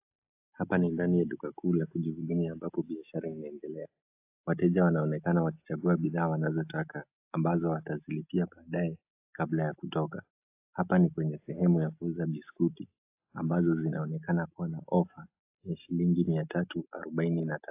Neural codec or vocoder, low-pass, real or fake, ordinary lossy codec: none; 3.6 kHz; real; AAC, 32 kbps